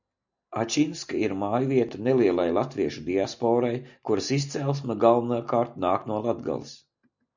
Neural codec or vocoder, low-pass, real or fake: none; 7.2 kHz; real